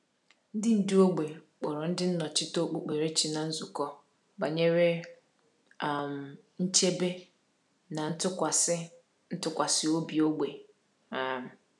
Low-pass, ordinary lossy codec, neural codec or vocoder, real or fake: none; none; none; real